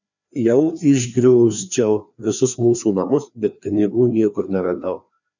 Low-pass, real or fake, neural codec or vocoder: 7.2 kHz; fake; codec, 16 kHz, 2 kbps, FreqCodec, larger model